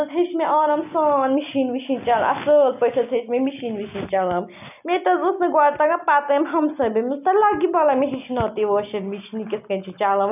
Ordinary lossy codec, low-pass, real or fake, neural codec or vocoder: none; 3.6 kHz; real; none